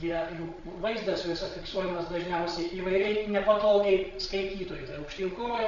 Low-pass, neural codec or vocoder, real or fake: 7.2 kHz; codec, 16 kHz, 8 kbps, FreqCodec, larger model; fake